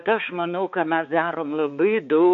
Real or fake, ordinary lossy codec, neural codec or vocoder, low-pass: fake; MP3, 48 kbps; codec, 16 kHz, 4 kbps, X-Codec, HuBERT features, trained on balanced general audio; 7.2 kHz